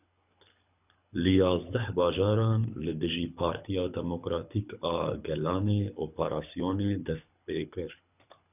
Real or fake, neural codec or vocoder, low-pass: fake; codec, 24 kHz, 6 kbps, HILCodec; 3.6 kHz